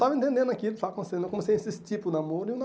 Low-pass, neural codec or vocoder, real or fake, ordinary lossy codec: none; none; real; none